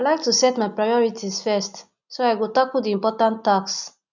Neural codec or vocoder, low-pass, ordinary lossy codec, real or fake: none; 7.2 kHz; none; real